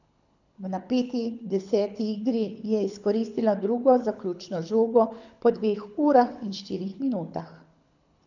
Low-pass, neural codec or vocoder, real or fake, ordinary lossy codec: 7.2 kHz; codec, 24 kHz, 6 kbps, HILCodec; fake; none